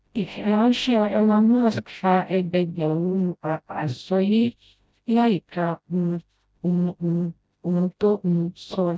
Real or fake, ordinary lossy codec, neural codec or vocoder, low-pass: fake; none; codec, 16 kHz, 0.5 kbps, FreqCodec, smaller model; none